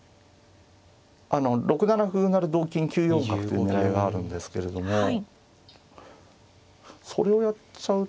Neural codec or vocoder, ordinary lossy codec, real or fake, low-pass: none; none; real; none